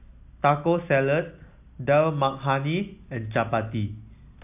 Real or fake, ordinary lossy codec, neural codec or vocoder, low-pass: real; AAC, 32 kbps; none; 3.6 kHz